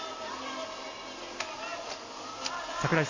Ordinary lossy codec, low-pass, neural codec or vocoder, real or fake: AAC, 32 kbps; 7.2 kHz; none; real